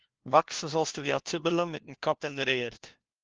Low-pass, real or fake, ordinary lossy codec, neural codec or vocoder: 7.2 kHz; fake; Opus, 16 kbps; codec, 16 kHz, 1 kbps, FunCodec, trained on LibriTTS, 50 frames a second